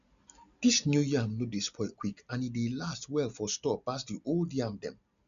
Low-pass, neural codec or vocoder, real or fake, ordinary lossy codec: 7.2 kHz; none; real; none